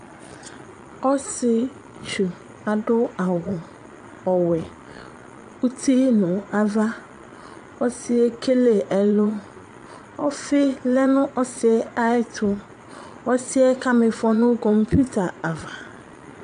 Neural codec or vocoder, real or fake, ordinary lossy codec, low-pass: vocoder, 22.05 kHz, 80 mel bands, Vocos; fake; AAC, 64 kbps; 9.9 kHz